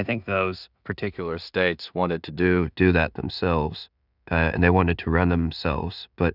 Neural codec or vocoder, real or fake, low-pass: codec, 16 kHz in and 24 kHz out, 0.4 kbps, LongCat-Audio-Codec, two codebook decoder; fake; 5.4 kHz